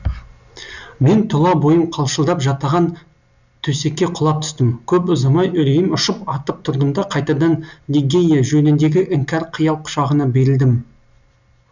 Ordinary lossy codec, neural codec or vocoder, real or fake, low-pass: none; none; real; 7.2 kHz